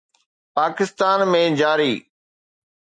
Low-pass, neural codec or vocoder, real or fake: 9.9 kHz; none; real